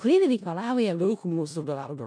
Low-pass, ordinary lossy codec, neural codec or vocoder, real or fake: 9.9 kHz; MP3, 96 kbps; codec, 16 kHz in and 24 kHz out, 0.4 kbps, LongCat-Audio-Codec, four codebook decoder; fake